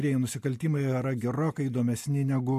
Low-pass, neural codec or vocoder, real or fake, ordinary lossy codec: 14.4 kHz; none; real; MP3, 64 kbps